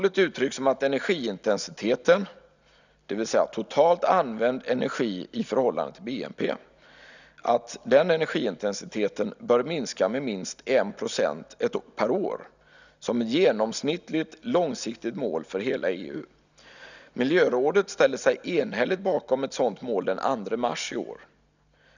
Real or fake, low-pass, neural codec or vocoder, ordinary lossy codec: real; 7.2 kHz; none; none